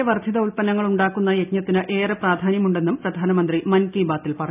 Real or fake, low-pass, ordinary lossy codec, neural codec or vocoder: real; 3.6 kHz; none; none